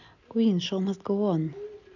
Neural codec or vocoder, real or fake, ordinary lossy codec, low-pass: none; real; none; 7.2 kHz